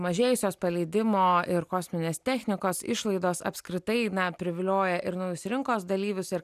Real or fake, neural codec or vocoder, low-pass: real; none; 14.4 kHz